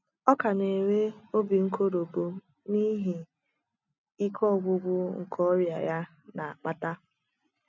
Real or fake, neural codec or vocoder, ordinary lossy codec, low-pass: real; none; none; 7.2 kHz